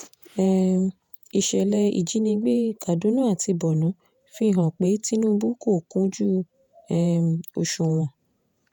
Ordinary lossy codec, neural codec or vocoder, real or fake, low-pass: none; vocoder, 48 kHz, 128 mel bands, Vocos; fake; 19.8 kHz